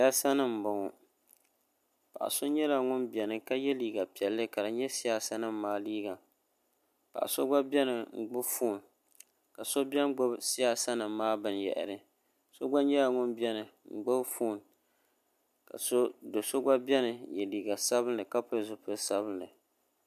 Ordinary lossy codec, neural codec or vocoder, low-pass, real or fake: MP3, 96 kbps; none; 14.4 kHz; real